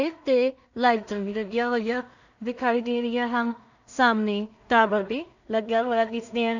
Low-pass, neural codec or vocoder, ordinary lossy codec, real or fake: 7.2 kHz; codec, 16 kHz in and 24 kHz out, 0.4 kbps, LongCat-Audio-Codec, two codebook decoder; none; fake